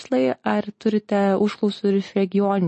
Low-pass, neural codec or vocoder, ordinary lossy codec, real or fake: 10.8 kHz; none; MP3, 32 kbps; real